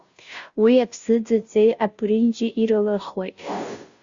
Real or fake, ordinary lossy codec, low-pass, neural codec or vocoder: fake; Opus, 64 kbps; 7.2 kHz; codec, 16 kHz, 0.5 kbps, FunCodec, trained on Chinese and English, 25 frames a second